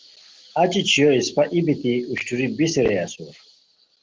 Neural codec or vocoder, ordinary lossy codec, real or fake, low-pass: none; Opus, 16 kbps; real; 7.2 kHz